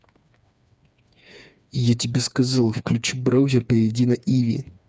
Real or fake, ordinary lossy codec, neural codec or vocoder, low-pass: fake; none; codec, 16 kHz, 4 kbps, FreqCodec, smaller model; none